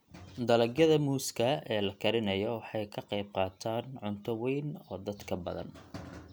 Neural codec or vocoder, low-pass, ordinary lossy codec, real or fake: none; none; none; real